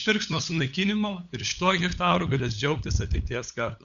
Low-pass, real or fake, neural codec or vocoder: 7.2 kHz; fake; codec, 16 kHz, 8 kbps, FunCodec, trained on LibriTTS, 25 frames a second